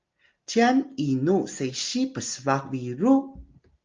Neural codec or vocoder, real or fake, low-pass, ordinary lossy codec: none; real; 7.2 kHz; Opus, 24 kbps